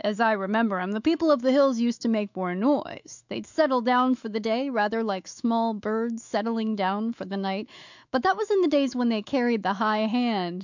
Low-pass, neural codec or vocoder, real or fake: 7.2 kHz; autoencoder, 48 kHz, 128 numbers a frame, DAC-VAE, trained on Japanese speech; fake